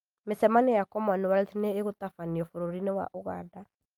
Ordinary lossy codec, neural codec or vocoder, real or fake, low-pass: Opus, 32 kbps; none; real; 19.8 kHz